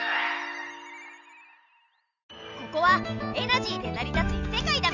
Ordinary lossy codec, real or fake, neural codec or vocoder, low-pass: none; real; none; 7.2 kHz